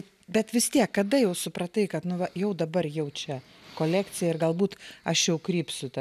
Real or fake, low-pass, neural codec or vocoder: real; 14.4 kHz; none